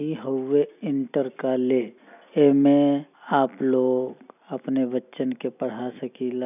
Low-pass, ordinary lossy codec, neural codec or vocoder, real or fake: 3.6 kHz; none; none; real